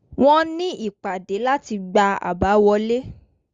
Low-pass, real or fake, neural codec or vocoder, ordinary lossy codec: 7.2 kHz; real; none; Opus, 32 kbps